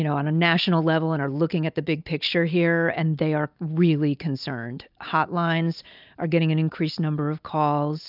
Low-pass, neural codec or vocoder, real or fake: 5.4 kHz; none; real